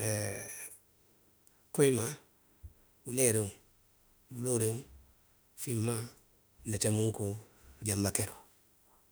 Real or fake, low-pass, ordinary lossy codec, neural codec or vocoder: fake; none; none; autoencoder, 48 kHz, 32 numbers a frame, DAC-VAE, trained on Japanese speech